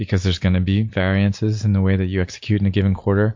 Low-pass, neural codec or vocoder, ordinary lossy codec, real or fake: 7.2 kHz; none; MP3, 48 kbps; real